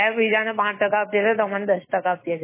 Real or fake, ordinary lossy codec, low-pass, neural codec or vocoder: fake; MP3, 16 kbps; 3.6 kHz; autoencoder, 48 kHz, 128 numbers a frame, DAC-VAE, trained on Japanese speech